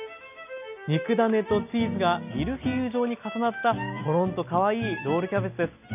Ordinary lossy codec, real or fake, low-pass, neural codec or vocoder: none; real; 3.6 kHz; none